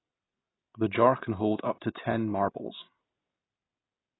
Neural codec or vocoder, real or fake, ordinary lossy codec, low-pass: none; real; AAC, 16 kbps; 7.2 kHz